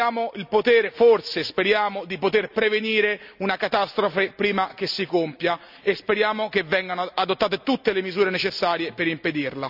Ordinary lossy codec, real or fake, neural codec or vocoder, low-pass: none; real; none; 5.4 kHz